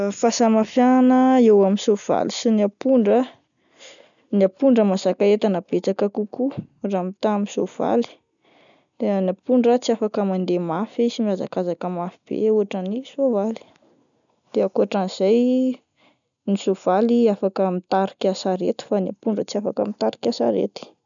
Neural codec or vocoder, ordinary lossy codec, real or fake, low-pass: none; none; real; 7.2 kHz